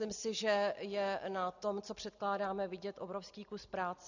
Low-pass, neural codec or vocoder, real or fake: 7.2 kHz; none; real